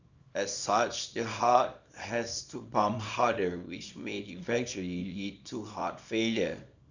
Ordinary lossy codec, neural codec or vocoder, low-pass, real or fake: Opus, 64 kbps; codec, 24 kHz, 0.9 kbps, WavTokenizer, small release; 7.2 kHz; fake